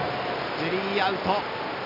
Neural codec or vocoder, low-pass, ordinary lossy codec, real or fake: none; 5.4 kHz; none; real